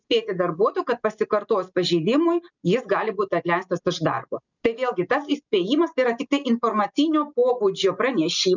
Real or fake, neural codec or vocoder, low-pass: real; none; 7.2 kHz